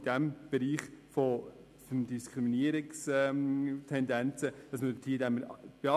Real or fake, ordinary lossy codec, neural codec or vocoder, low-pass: real; none; none; 14.4 kHz